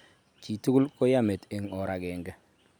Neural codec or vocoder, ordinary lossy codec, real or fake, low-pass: none; none; real; none